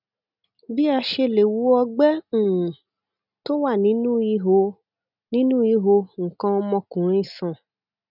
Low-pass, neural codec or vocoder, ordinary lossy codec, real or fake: 5.4 kHz; none; none; real